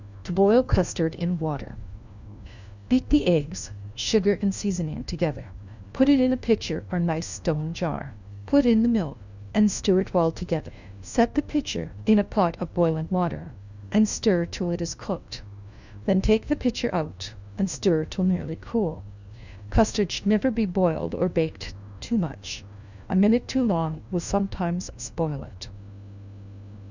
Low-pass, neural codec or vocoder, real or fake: 7.2 kHz; codec, 16 kHz, 1 kbps, FunCodec, trained on LibriTTS, 50 frames a second; fake